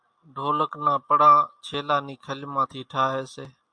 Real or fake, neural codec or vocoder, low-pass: real; none; 9.9 kHz